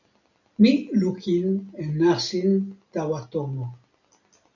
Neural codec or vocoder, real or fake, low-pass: none; real; 7.2 kHz